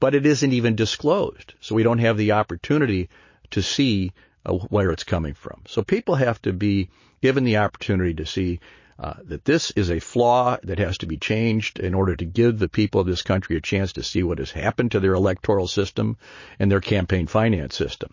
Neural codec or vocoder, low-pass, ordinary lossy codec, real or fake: none; 7.2 kHz; MP3, 32 kbps; real